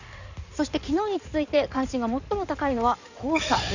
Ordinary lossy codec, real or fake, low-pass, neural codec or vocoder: none; fake; 7.2 kHz; codec, 16 kHz in and 24 kHz out, 2.2 kbps, FireRedTTS-2 codec